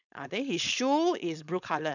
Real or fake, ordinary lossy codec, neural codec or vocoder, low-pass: fake; none; codec, 16 kHz, 4.8 kbps, FACodec; 7.2 kHz